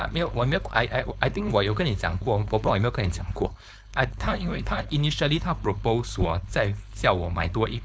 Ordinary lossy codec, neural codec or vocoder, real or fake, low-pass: none; codec, 16 kHz, 4.8 kbps, FACodec; fake; none